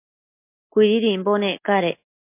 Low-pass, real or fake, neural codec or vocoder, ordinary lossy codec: 3.6 kHz; real; none; MP3, 32 kbps